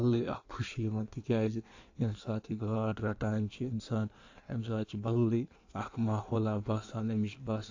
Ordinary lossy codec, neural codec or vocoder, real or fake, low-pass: AAC, 48 kbps; codec, 16 kHz in and 24 kHz out, 1.1 kbps, FireRedTTS-2 codec; fake; 7.2 kHz